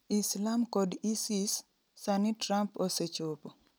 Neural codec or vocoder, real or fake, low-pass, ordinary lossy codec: none; real; none; none